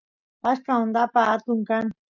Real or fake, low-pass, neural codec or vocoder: real; 7.2 kHz; none